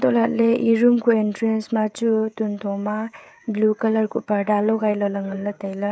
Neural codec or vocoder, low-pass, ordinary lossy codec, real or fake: codec, 16 kHz, 16 kbps, FreqCodec, smaller model; none; none; fake